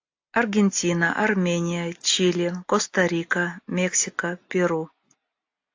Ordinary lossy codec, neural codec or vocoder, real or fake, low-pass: AAC, 48 kbps; none; real; 7.2 kHz